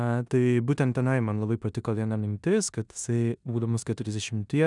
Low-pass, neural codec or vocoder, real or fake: 10.8 kHz; codec, 16 kHz in and 24 kHz out, 0.9 kbps, LongCat-Audio-Codec, four codebook decoder; fake